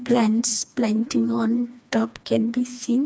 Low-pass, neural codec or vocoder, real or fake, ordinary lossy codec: none; codec, 16 kHz, 2 kbps, FreqCodec, smaller model; fake; none